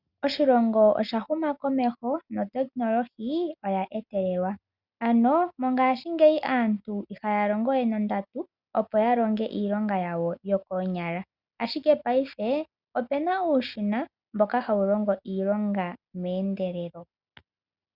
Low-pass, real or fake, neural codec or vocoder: 5.4 kHz; real; none